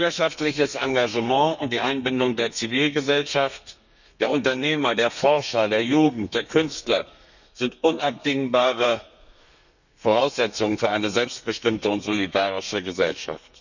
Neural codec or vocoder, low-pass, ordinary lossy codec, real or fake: codec, 32 kHz, 1.9 kbps, SNAC; 7.2 kHz; none; fake